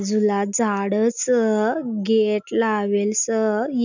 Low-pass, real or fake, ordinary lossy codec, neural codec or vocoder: none; real; none; none